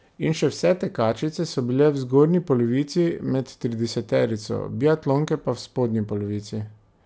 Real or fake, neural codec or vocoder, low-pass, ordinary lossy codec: real; none; none; none